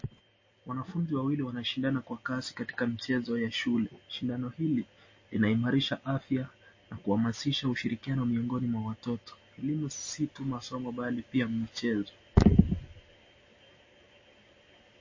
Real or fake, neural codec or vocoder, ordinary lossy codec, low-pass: real; none; MP3, 32 kbps; 7.2 kHz